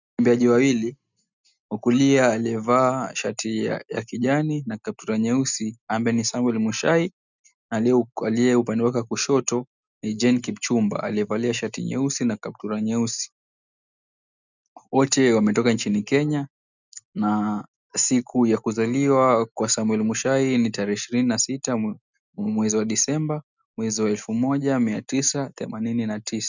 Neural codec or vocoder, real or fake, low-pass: none; real; 7.2 kHz